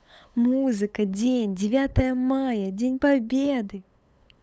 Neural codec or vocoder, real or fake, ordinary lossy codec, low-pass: codec, 16 kHz, 8 kbps, FunCodec, trained on LibriTTS, 25 frames a second; fake; none; none